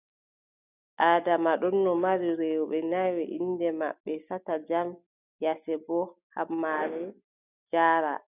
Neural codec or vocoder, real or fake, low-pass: none; real; 3.6 kHz